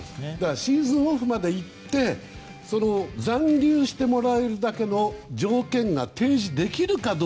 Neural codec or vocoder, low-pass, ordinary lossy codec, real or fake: none; none; none; real